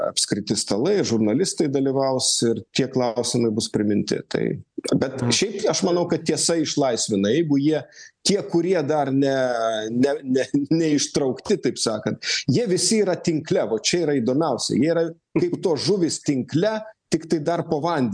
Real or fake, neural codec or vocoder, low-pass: real; none; 9.9 kHz